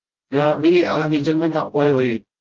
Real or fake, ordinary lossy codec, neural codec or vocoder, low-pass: fake; Opus, 32 kbps; codec, 16 kHz, 0.5 kbps, FreqCodec, smaller model; 7.2 kHz